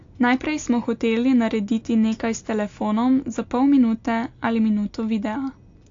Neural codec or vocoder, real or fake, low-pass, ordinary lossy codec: none; real; 7.2 kHz; AAC, 48 kbps